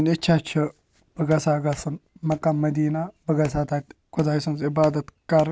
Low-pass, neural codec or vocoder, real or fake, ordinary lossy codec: none; none; real; none